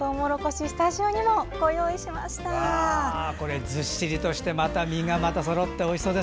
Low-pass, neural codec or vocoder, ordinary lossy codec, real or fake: none; none; none; real